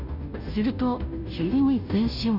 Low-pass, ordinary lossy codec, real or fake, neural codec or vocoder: 5.4 kHz; none; fake; codec, 16 kHz, 0.5 kbps, FunCodec, trained on Chinese and English, 25 frames a second